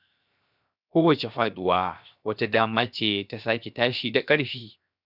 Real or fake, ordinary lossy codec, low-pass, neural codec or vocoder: fake; none; 5.4 kHz; codec, 16 kHz, 0.7 kbps, FocalCodec